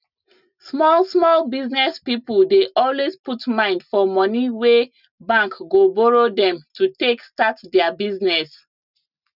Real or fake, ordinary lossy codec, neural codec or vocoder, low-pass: real; none; none; 5.4 kHz